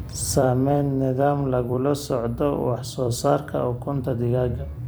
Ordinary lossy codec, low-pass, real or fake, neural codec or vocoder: none; none; fake; vocoder, 44.1 kHz, 128 mel bands every 256 samples, BigVGAN v2